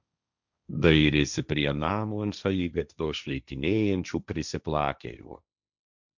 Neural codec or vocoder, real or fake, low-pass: codec, 16 kHz, 1.1 kbps, Voila-Tokenizer; fake; 7.2 kHz